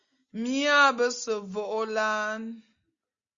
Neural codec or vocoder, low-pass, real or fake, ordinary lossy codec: none; 7.2 kHz; real; Opus, 64 kbps